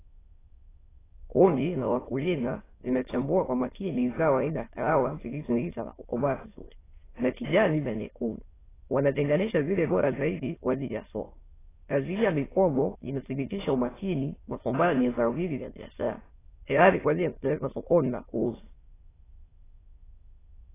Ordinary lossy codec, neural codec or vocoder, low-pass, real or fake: AAC, 16 kbps; autoencoder, 22.05 kHz, a latent of 192 numbers a frame, VITS, trained on many speakers; 3.6 kHz; fake